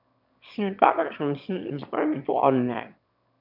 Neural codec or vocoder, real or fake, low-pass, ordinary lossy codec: autoencoder, 22.05 kHz, a latent of 192 numbers a frame, VITS, trained on one speaker; fake; 5.4 kHz; none